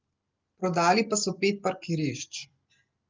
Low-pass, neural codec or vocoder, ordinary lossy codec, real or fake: 7.2 kHz; none; Opus, 24 kbps; real